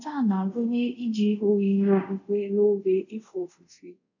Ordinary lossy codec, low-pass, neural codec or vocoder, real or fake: none; 7.2 kHz; codec, 24 kHz, 0.5 kbps, DualCodec; fake